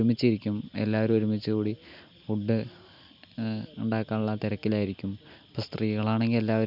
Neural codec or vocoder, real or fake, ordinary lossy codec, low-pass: none; real; none; 5.4 kHz